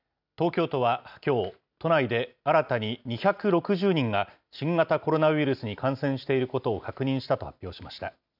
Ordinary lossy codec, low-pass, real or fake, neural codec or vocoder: none; 5.4 kHz; real; none